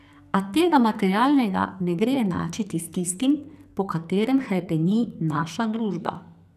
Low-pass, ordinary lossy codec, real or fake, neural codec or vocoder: 14.4 kHz; none; fake; codec, 44.1 kHz, 2.6 kbps, SNAC